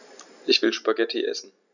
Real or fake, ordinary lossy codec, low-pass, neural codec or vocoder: real; none; 7.2 kHz; none